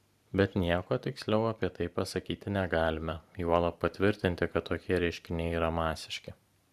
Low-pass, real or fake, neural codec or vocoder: 14.4 kHz; fake; vocoder, 44.1 kHz, 128 mel bands every 512 samples, BigVGAN v2